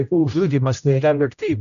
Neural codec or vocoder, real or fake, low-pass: codec, 16 kHz, 0.5 kbps, X-Codec, HuBERT features, trained on general audio; fake; 7.2 kHz